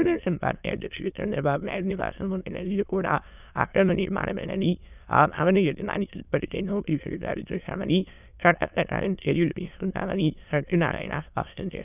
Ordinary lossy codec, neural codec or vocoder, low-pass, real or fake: none; autoencoder, 22.05 kHz, a latent of 192 numbers a frame, VITS, trained on many speakers; 3.6 kHz; fake